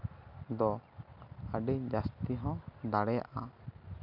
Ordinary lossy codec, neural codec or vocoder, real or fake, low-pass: none; none; real; 5.4 kHz